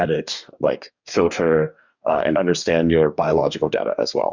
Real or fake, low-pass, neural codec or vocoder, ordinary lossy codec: fake; 7.2 kHz; codec, 44.1 kHz, 2.6 kbps, DAC; Opus, 64 kbps